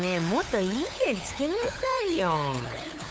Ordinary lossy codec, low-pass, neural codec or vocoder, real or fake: none; none; codec, 16 kHz, 8 kbps, FunCodec, trained on LibriTTS, 25 frames a second; fake